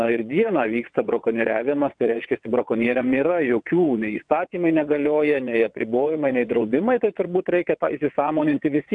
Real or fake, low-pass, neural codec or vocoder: fake; 9.9 kHz; vocoder, 22.05 kHz, 80 mel bands, WaveNeXt